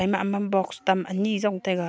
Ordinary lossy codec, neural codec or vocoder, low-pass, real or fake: none; none; none; real